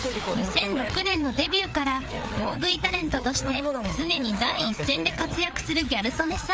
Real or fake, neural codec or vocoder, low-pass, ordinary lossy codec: fake; codec, 16 kHz, 4 kbps, FreqCodec, larger model; none; none